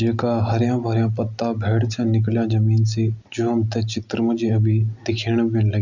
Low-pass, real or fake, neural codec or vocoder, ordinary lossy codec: 7.2 kHz; real; none; none